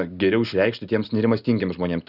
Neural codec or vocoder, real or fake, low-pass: none; real; 5.4 kHz